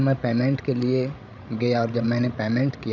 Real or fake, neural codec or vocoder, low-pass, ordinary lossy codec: fake; codec, 16 kHz, 16 kbps, FreqCodec, larger model; 7.2 kHz; none